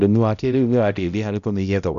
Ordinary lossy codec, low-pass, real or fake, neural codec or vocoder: none; 7.2 kHz; fake; codec, 16 kHz, 0.5 kbps, X-Codec, HuBERT features, trained on balanced general audio